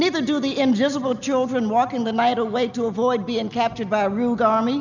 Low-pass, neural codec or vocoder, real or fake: 7.2 kHz; none; real